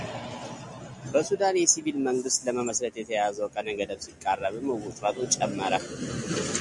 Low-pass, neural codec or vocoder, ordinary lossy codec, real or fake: 10.8 kHz; none; MP3, 96 kbps; real